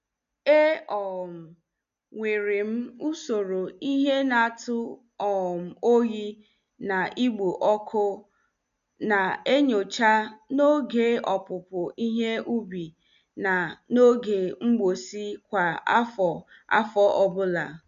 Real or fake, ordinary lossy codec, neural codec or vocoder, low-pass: real; MP3, 64 kbps; none; 7.2 kHz